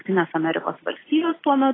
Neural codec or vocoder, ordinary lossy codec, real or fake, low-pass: none; AAC, 16 kbps; real; 7.2 kHz